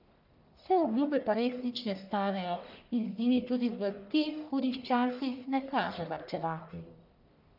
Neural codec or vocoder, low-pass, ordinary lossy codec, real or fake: codec, 44.1 kHz, 1.7 kbps, Pupu-Codec; 5.4 kHz; none; fake